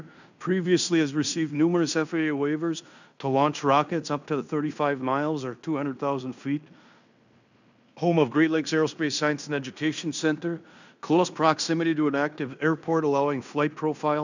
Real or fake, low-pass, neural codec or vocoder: fake; 7.2 kHz; codec, 16 kHz in and 24 kHz out, 0.9 kbps, LongCat-Audio-Codec, fine tuned four codebook decoder